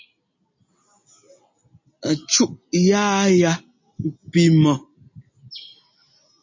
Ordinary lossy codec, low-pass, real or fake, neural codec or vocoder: MP3, 32 kbps; 7.2 kHz; real; none